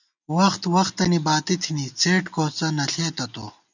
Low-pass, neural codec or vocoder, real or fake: 7.2 kHz; none; real